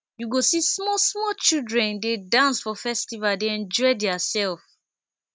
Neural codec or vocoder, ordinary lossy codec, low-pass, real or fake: none; none; none; real